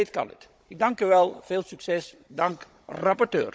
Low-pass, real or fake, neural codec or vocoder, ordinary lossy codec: none; fake; codec, 16 kHz, 16 kbps, FunCodec, trained on Chinese and English, 50 frames a second; none